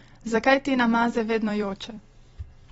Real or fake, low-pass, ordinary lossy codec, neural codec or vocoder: fake; 19.8 kHz; AAC, 24 kbps; vocoder, 44.1 kHz, 128 mel bands every 256 samples, BigVGAN v2